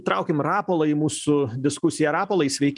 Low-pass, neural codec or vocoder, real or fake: 10.8 kHz; none; real